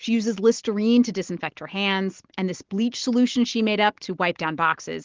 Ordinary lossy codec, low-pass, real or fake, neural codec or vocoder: Opus, 16 kbps; 7.2 kHz; real; none